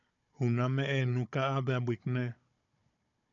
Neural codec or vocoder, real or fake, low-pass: codec, 16 kHz, 16 kbps, FunCodec, trained on Chinese and English, 50 frames a second; fake; 7.2 kHz